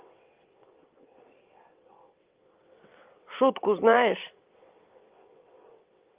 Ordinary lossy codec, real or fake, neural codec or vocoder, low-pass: Opus, 24 kbps; fake; vocoder, 22.05 kHz, 80 mel bands, WaveNeXt; 3.6 kHz